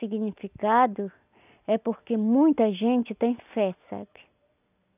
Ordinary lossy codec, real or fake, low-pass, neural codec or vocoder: none; real; 3.6 kHz; none